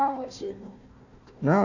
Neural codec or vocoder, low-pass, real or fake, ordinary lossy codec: codec, 16 kHz, 1 kbps, FunCodec, trained on Chinese and English, 50 frames a second; 7.2 kHz; fake; none